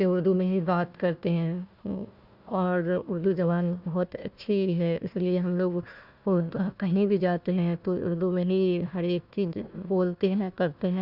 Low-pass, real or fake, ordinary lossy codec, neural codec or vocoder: 5.4 kHz; fake; Opus, 64 kbps; codec, 16 kHz, 1 kbps, FunCodec, trained on Chinese and English, 50 frames a second